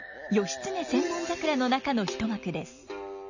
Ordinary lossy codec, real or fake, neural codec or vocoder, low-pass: none; real; none; 7.2 kHz